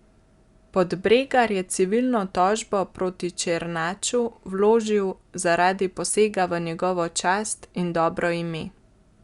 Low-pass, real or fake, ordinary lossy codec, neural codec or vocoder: 10.8 kHz; real; Opus, 64 kbps; none